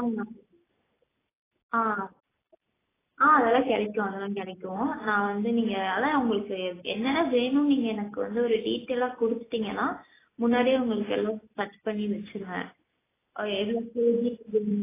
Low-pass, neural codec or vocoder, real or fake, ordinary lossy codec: 3.6 kHz; none; real; AAC, 16 kbps